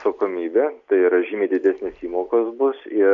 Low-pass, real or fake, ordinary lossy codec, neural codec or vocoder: 7.2 kHz; real; AAC, 64 kbps; none